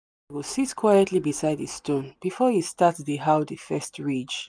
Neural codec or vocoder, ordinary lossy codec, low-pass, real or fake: none; none; none; real